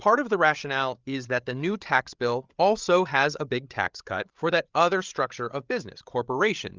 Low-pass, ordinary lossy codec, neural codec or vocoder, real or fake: 7.2 kHz; Opus, 24 kbps; codec, 16 kHz, 8 kbps, FreqCodec, larger model; fake